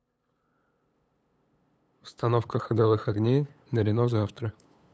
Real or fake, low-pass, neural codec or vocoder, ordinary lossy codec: fake; none; codec, 16 kHz, 8 kbps, FunCodec, trained on LibriTTS, 25 frames a second; none